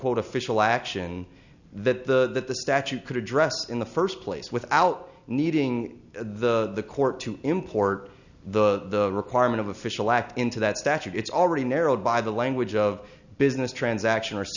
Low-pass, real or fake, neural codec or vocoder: 7.2 kHz; real; none